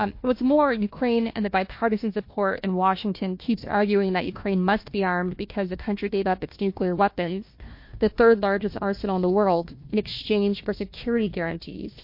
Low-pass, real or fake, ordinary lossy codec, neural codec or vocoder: 5.4 kHz; fake; MP3, 32 kbps; codec, 16 kHz, 1 kbps, FunCodec, trained on Chinese and English, 50 frames a second